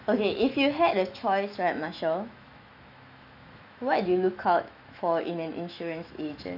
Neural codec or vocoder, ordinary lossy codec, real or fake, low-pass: autoencoder, 48 kHz, 128 numbers a frame, DAC-VAE, trained on Japanese speech; none; fake; 5.4 kHz